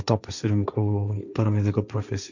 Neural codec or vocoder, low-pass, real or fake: codec, 16 kHz, 1.1 kbps, Voila-Tokenizer; 7.2 kHz; fake